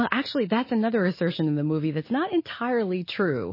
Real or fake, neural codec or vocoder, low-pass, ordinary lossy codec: real; none; 5.4 kHz; MP3, 24 kbps